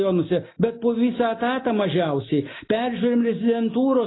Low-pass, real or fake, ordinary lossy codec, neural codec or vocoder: 7.2 kHz; real; AAC, 16 kbps; none